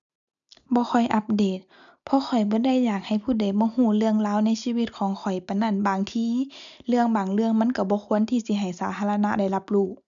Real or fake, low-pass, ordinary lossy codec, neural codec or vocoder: real; 7.2 kHz; none; none